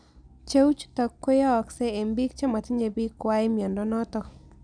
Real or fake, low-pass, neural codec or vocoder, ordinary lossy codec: real; 9.9 kHz; none; none